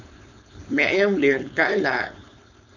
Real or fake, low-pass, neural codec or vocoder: fake; 7.2 kHz; codec, 16 kHz, 4.8 kbps, FACodec